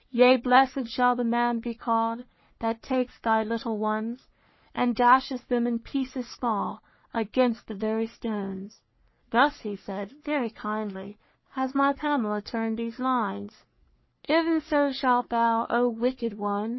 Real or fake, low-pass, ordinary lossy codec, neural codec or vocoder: fake; 7.2 kHz; MP3, 24 kbps; codec, 44.1 kHz, 3.4 kbps, Pupu-Codec